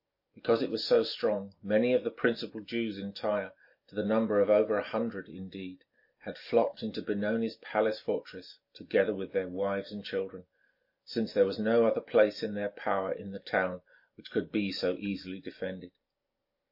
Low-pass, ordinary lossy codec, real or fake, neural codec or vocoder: 5.4 kHz; MP3, 32 kbps; real; none